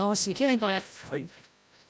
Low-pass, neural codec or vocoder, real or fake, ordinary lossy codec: none; codec, 16 kHz, 0.5 kbps, FreqCodec, larger model; fake; none